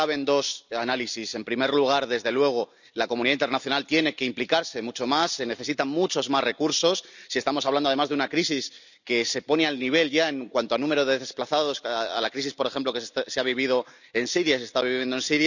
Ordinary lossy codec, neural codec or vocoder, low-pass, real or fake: none; none; 7.2 kHz; real